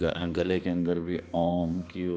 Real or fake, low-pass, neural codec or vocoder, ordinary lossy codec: fake; none; codec, 16 kHz, 2 kbps, X-Codec, HuBERT features, trained on balanced general audio; none